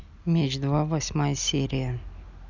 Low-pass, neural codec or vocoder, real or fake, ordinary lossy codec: 7.2 kHz; none; real; none